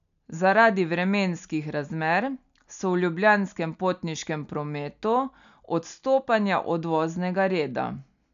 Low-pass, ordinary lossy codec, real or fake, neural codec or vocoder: 7.2 kHz; none; real; none